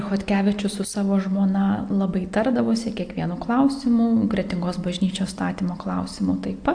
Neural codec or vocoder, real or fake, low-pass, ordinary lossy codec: none; real; 9.9 kHz; AAC, 64 kbps